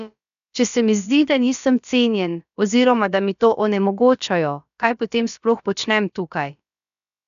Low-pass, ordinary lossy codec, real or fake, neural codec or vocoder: 7.2 kHz; none; fake; codec, 16 kHz, about 1 kbps, DyCAST, with the encoder's durations